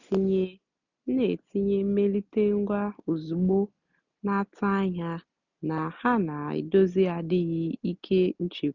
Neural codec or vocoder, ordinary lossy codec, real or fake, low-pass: none; none; real; 7.2 kHz